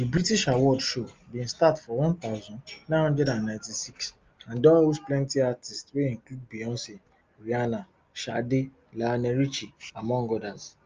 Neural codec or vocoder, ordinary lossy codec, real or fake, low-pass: none; Opus, 24 kbps; real; 7.2 kHz